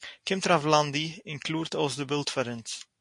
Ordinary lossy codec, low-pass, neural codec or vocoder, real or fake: MP3, 48 kbps; 10.8 kHz; none; real